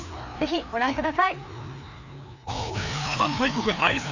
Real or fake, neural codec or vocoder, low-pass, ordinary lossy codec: fake; codec, 16 kHz, 2 kbps, FreqCodec, larger model; 7.2 kHz; none